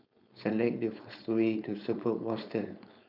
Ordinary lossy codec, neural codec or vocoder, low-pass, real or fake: none; codec, 16 kHz, 4.8 kbps, FACodec; 5.4 kHz; fake